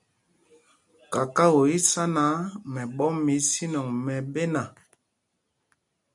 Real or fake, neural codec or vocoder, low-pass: real; none; 10.8 kHz